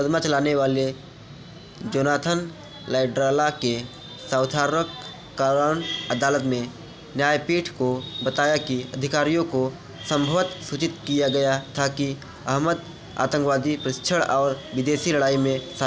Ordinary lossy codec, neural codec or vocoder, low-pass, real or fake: none; none; none; real